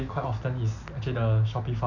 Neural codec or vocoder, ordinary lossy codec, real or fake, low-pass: none; none; real; 7.2 kHz